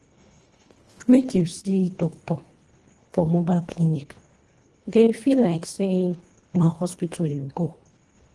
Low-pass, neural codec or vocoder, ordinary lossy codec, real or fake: 10.8 kHz; codec, 24 kHz, 1.5 kbps, HILCodec; Opus, 24 kbps; fake